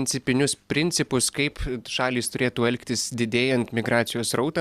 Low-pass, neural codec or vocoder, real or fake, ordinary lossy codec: 14.4 kHz; none; real; Opus, 64 kbps